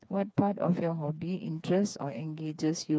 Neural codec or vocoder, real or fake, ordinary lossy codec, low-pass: codec, 16 kHz, 4 kbps, FreqCodec, smaller model; fake; none; none